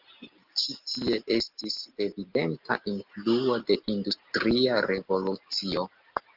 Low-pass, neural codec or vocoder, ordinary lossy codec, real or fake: 5.4 kHz; none; Opus, 32 kbps; real